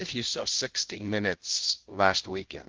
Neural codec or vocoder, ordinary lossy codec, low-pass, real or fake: codec, 16 kHz, 0.5 kbps, FunCodec, trained on Chinese and English, 25 frames a second; Opus, 16 kbps; 7.2 kHz; fake